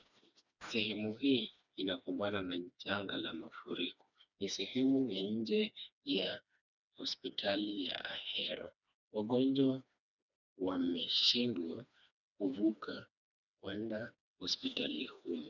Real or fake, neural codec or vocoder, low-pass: fake; codec, 16 kHz, 2 kbps, FreqCodec, smaller model; 7.2 kHz